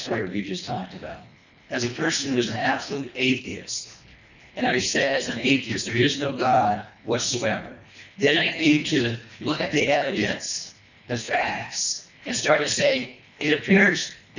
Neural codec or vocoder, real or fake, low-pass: codec, 24 kHz, 1.5 kbps, HILCodec; fake; 7.2 kHz